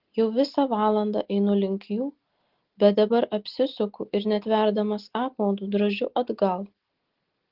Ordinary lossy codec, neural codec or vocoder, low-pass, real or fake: Opus, 16 kbps; none; 5.4 kHz; real